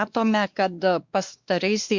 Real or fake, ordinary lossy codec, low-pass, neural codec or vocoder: fake; Opus, 64 kbps; 7.2 kHz; codec, 16 kHz, 2 kbps, X-Codec, WavLM features, trained on Multilingual LibriSpeech